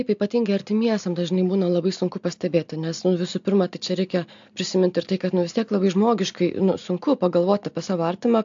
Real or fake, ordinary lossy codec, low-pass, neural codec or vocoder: real; AAC, 64 kbps; 7.2 kHz; none